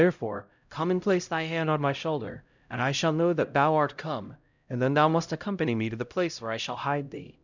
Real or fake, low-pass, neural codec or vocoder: fake; 7.2 kHz; codec, 16 kHz, 0.5 kbps, X-Codec, HuBERT features, trained on LibriSpeech